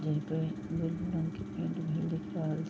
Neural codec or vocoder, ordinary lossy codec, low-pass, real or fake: none; none; none; real